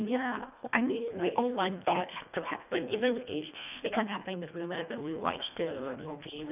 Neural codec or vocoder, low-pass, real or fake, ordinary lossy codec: codec, 24 kHz, 1.5 kbps, HILCodec; 3.6 kHz; fake; none